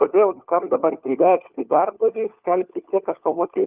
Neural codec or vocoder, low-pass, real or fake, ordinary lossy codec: codec, 16 kHz, 4 kbps, FunCodec, trained on LibriTTS, 50 frames a second; 3.6 kHz; fake; Opus, 24 kbps